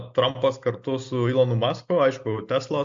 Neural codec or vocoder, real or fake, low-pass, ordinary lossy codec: none; real; 7.2 kHz; MP3, 48 kbps